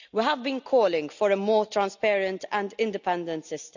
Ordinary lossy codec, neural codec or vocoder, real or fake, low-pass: none; none; real; 7.2 kHz